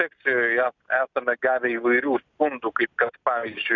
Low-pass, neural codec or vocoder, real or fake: 7.2 kHz; none; real